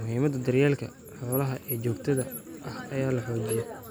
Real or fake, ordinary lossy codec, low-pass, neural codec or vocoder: real; none; none; none